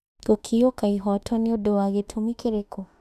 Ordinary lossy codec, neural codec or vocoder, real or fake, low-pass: none; autoencoder, 48 kHz, 32 numbers a frame, DAC-VAE, trained on Japanese speech; fake; 14.4 kHz